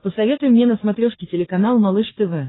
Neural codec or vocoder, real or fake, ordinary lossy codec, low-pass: codec, 44.1 kHz, 3.4 kbps, Pupu-Codec; fake; AAC, 16 kbps; 7.2 kHz